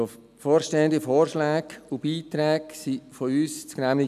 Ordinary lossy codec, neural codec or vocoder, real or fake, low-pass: none; none; real; 14.4 kHz